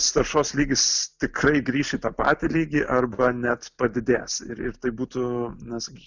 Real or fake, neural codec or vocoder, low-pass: real; none; 7.2 kHz